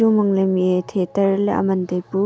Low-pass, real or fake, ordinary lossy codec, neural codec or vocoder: none; real; none; none